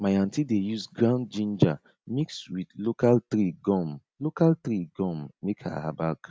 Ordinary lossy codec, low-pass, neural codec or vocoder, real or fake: none; none; none; real